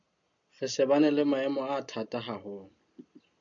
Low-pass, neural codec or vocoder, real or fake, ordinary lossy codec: 7.2 kHz; none; real; AAC, 64 kbps